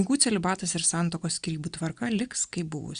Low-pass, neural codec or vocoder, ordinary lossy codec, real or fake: 9.9 kHz; none; Opus, 64 kbps; real